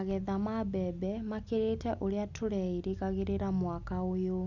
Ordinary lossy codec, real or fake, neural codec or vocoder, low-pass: none; real; none; 7.2 kHz